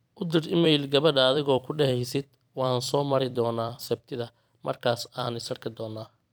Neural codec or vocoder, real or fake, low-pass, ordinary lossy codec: vocoder, 44.1 kHz, 128 mel bands every 256 samples, BigVGAN v2; fake; none; none